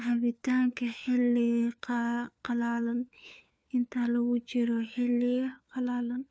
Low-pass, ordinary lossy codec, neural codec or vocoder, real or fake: none; none; codec, 16 kHz, 4 kbps, FunCodec, trained on LibriTTS, 50 frames a second; fake